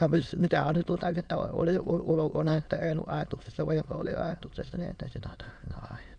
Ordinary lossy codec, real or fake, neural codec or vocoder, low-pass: none; fake; autoencoder, 22.05 kHz, a latent of 192 numbers a frame, VITS, trained on many speakers; 9.9 kHz